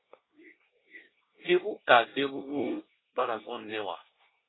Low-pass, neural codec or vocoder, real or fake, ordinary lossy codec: 7.2 kHz; codec, 24 kHz, 1 kbps, SNAC; fake; AAC, 16 kbps